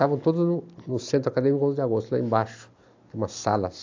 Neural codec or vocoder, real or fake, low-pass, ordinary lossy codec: none; real; 7.2 kHz; AAC, 48 kbps